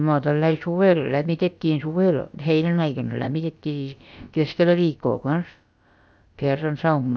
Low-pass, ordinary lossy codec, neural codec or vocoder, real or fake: none; none; codec, 16 kHz, about 1 kbps, DyCAST, with the encoder's durations; fake